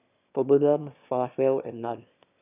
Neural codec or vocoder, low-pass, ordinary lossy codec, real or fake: autoencoder, 22.05 kHz, a latent of 192 numbers a frame, VITS, trained on one speaker; 3.6 kHz; none; fake